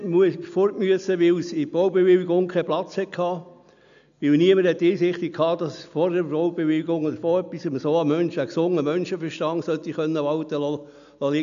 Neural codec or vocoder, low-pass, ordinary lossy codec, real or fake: none; 7.2 kHz; none; real